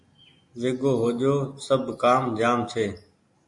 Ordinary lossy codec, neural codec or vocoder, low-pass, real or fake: MP3, 48 kbps; none; 10.8 kHz; real